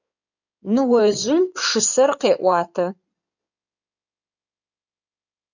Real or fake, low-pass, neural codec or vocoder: fake; 7.2 kHz; codec, 16 kHz in and 24 kHz out, 2.2 kbps, FireRedTTS-2 codec